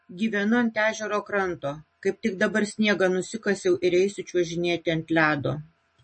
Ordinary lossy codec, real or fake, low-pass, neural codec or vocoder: MP3, 32 kbps; real; 10.8 kHz; none